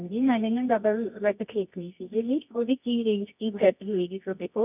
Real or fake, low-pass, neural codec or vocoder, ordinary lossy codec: fake; 3.6 kHz; codec, 24 kHz, 0.9 kbps, WavTokenizer, medium music audio release; none